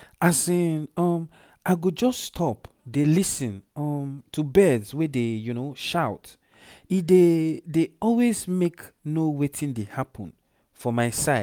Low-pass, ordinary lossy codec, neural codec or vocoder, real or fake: none; none; none; real